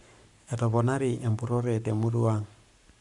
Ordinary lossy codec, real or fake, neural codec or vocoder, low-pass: MP3, 96 kbps; fake; codec, 44.1 kHz, 7.8 kbps, Pupu-Codec; 10.8 kHz